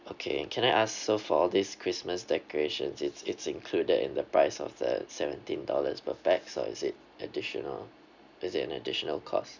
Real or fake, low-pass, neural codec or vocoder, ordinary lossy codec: real; 7.2 kHz; none; none